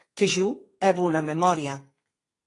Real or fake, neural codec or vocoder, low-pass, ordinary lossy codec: fake; codec, 32 kHz, 1.9 kbps, SNAC; 10.8 kHz; AAC, 32 kbps